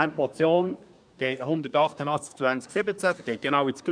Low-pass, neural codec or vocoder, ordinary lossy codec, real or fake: 9.9 kHz; codec, 24 kHz, 1 kbps, SNAC; none; fake